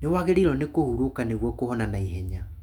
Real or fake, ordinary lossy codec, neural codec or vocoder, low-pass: fake; none; autoencoder, 48 kHz, 128 numbers a frame, DAC-VAE, trained on Japanese speech; 19.8 kHz